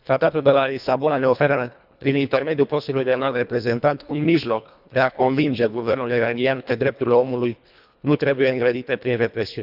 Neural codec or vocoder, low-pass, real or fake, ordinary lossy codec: codec, 24 kHz, 1.5 kbps, HILCodec; 5.4 kHz; fake; none